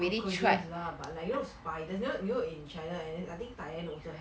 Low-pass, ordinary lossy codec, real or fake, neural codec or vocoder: none; none; real; none